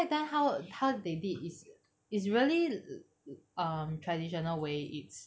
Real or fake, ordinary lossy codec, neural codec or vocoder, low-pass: real; none; none; none